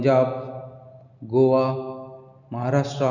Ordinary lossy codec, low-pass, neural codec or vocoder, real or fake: none; 7.2 kHz; none; real